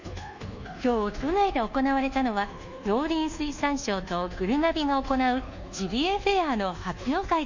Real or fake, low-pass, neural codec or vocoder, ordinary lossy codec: fake; 7.2 kHz; codec, 24 kHz, 1.2 kbps, DualCodec; Opus, 64 kbps